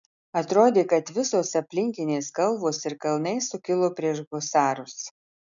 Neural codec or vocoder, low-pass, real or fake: none; 7.2 kHz; real